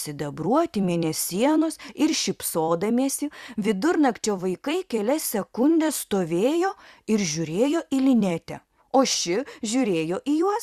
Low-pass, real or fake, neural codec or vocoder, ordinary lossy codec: 14.4 kHz; fake; vocoder, 44.1 kHz, 128 mel bands every 256 samples, BigVGAN v2; Opus, 64 kbps